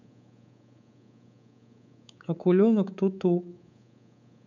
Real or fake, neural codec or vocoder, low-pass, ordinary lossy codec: fake; codec, 24 kHz, 3.1 kbps, DualCodec; 7.2 kHz; none